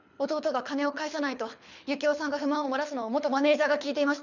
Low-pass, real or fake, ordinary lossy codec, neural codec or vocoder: 7.2 kHz; fake; none; codec, 24 kHz, 6 kbps, HILCodec